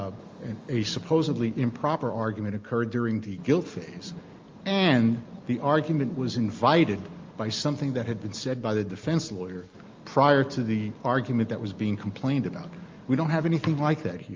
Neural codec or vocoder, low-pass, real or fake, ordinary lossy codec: none; 7.2 kHz; real; Opus, 32 kbps